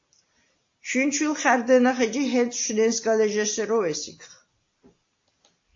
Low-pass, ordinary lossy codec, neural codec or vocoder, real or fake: 7.2 kHz; AAC, 48 kbps; none; real